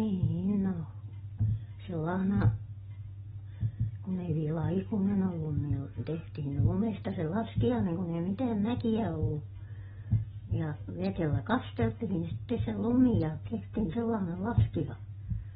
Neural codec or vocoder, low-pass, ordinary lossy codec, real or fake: none; 19.8 kHz; AAC, 16 kbps; real